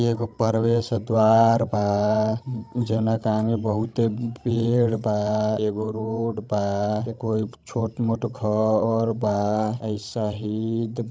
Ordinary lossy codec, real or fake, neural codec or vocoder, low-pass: none; fake; codec, 16 kHz, 16 kbps, FreqCodec, larger model; none